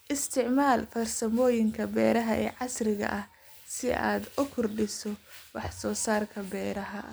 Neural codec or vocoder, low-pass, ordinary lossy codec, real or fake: none; none; none; real